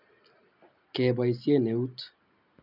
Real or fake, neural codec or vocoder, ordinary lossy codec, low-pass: real; none; none; 5.4 kHz